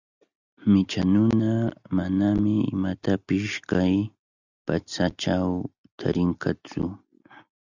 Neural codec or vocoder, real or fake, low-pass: none; real; 7.2 kHz